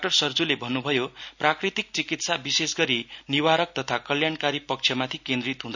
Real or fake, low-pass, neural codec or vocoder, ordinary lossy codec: real; 7.2 kHz; none; none